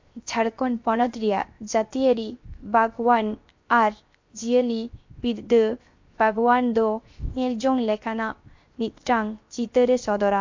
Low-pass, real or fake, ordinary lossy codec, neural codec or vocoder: 7.2 kHz; fake; MP3, 48 kbps; codec, 16 kHz, 0.7 kbps, FocalCodec